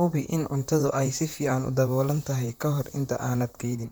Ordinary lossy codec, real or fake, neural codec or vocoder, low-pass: none; fake; vocoder, 44.1 kHz, 128 mel bands, Pupu-Vocoder; none